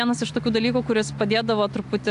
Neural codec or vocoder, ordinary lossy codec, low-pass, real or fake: none; AAC, 64 kbps; 10.8 kHz; real